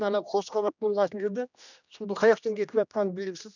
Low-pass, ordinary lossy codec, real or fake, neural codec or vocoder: 7.2 kHz; none; fake; codec, 16 kHz, 1 kbps, X-Codec, HuBERT features, trained on general audio